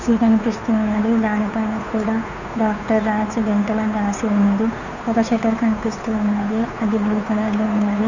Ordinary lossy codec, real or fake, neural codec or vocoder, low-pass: none; fake; codec, 16 kHz, 2 kbps, FunCodec, trained on Chinese and English, 25 frames a second; 7.2 kHz